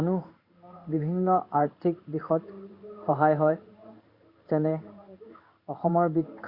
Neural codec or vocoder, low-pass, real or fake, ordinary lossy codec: codec, 16 kHz in and 24 kHz out, 1 kbps, XY-Tokenizer; 5.4 kHz; fake; Opus, 64 kbps